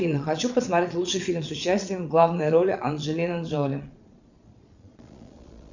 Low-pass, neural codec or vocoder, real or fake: 7.2 kHz; vocoder, 22.05 kHz, 80 mel bands, Vocos; fake